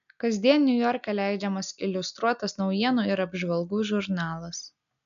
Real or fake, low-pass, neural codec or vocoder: real; 7.2 kHz; none